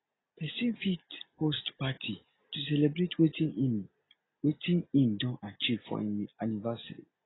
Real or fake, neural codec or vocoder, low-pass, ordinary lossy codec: real; none; 7.2 kHz; AAC, 16 kbps